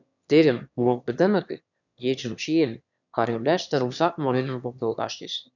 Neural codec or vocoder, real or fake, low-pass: autoencoder, 22.05 kHz, a latent of 192 numbers a frame, VITS, trained on one speaker; fake; 7.2 kHz